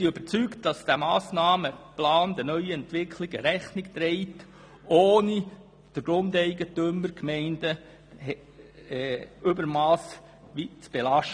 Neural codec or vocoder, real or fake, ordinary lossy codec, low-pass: none; real; none; 9.9 kHz